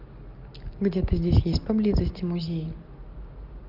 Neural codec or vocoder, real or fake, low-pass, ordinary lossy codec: none; real; 5.4 kHz; Opus, 32 kbps